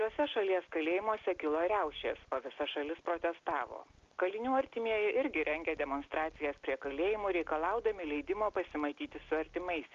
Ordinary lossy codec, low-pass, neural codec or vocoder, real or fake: Opus, 24 kbps; 7.2 kHz; none; real